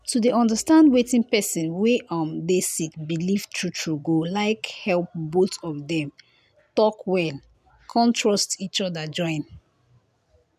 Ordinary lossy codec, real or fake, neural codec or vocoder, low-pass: none; real; none; 14.4 kHz